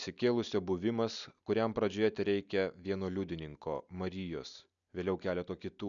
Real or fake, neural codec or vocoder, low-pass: real; none; 7.2 kHz